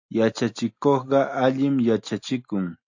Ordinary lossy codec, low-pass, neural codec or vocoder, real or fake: AAC, 48 kbps; 7.2 kHz; none; real